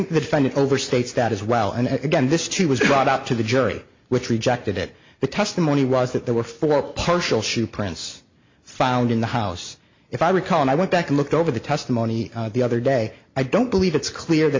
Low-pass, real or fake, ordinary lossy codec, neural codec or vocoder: 7.2 kHz; real; MP3, 48 kbps; none